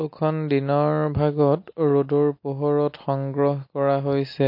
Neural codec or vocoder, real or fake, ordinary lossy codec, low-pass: none; real; MP3, 32 kbps; 5.4 kHz